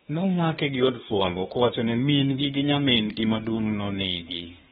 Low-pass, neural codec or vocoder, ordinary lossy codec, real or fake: 10.8 kHz; codec, 24 kHz, 1 kbps, SNAC; AAC, 16 kbps; fake